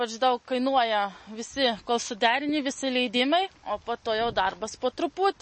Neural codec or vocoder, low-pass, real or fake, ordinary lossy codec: none; 9.9 kHz; real; MP3, 32 kbps